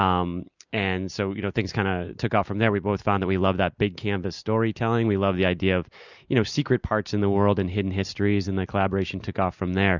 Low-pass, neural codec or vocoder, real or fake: 7.2 kHz; none; real